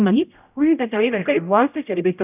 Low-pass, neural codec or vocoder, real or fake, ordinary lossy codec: 3.6 kHz; codec, 16 kHz, 0.5 kbps, X-Codec, HuBERT features, trained on general audio; fake; none